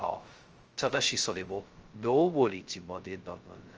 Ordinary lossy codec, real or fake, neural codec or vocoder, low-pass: Opus, 24 kbps; fake; codec, 16 kHz, 0.2 kbps, FocalCodec; 7.2 kHz